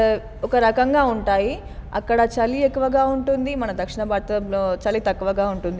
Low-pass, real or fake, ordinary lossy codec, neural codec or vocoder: none; real; none; none